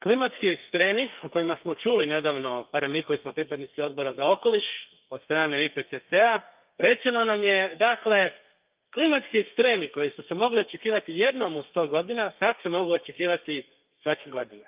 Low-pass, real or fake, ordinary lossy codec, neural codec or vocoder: 3.6 kHz; fake; Opus, 24 kbps; codec, 32 kHz, 1.9 kbps, SNAC